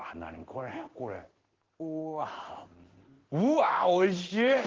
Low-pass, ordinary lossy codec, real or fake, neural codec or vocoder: 7.2 kHz; Opus, 16 kbps; fake; codec, 16 kHz in and 24 kHz out, 1 kbps, XY-Tokenizer